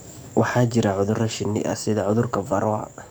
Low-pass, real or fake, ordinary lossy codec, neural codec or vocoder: none; fake; none; codec, 44.1 kHz, 7.8 kbps, DAC